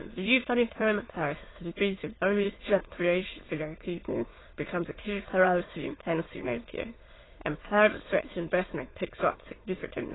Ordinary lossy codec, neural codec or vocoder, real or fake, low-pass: AAC, 16 kbps; autoencoder, 22.05 kHz, a latent of 192 numbers a frame, VITS, trained on many speakers; fake; 7.2 kHz